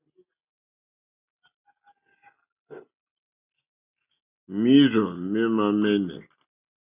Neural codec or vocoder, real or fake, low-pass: none; real; 3.6 kHz